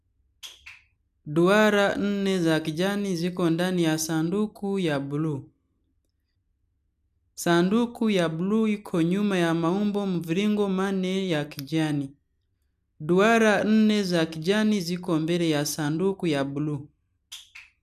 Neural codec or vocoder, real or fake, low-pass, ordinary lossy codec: none; real; 14.4 kHz; none